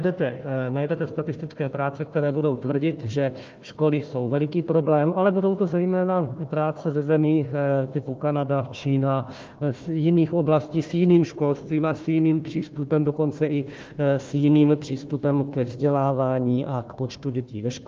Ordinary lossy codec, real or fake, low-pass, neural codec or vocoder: Opus, 24 kbps; fake; 7.2 kHz; codec, 16 kHz, 1 kbps, FunCodec, trained on Chinese and English, 50 frames a second